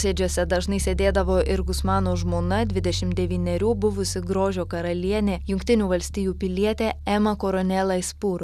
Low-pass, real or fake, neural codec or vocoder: 14.4 kHz; real; none